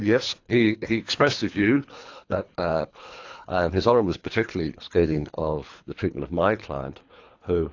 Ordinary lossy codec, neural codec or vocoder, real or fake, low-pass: AAC, 48 kbps; codec, 24 kHz, 3 kbps, HILCodec; fake; 7.2 kHz